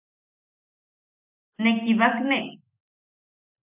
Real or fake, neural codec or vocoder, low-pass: real; none; 3.6 kHz